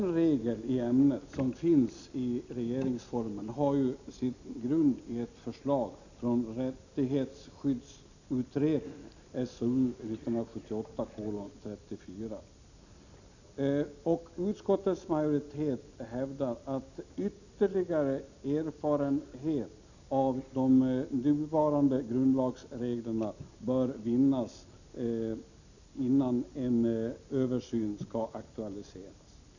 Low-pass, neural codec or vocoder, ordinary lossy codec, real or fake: 7.2 kHz; none; none; real